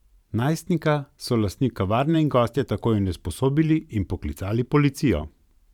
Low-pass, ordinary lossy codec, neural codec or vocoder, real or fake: 19.8 kHz; none; vocoder, 44.1 kHz, 128 mel bands every 512 samples, BigVGAN v2; fake